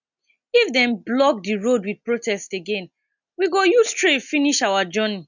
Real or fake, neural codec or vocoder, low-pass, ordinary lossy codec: real; none; 7.2 kHz; none